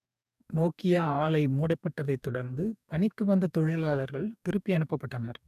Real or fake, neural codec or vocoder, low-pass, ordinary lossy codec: fake; codec, 44.1 kHz, 2.6 kbps, DAC; 14.4 kHz; none